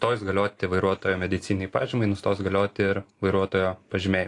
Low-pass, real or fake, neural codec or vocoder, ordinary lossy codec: 10.8 kHz; real; none; AAC, 48 kbps